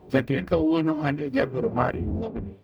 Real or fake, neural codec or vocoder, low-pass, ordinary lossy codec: fake; codec, 44.1 kHz, 0.9 kbps, DAC; none; none